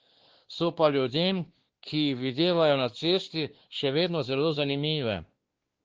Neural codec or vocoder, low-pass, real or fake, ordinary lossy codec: codec, 16 kHz, 2 kbps, X-Codec, WavLM features, trained on Multilingual LibriSpeech; 7.2 kHz; fake; Opus, 16 kbps